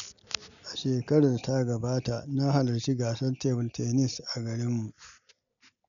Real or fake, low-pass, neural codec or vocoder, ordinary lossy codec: real; 7.2 kHz; none; none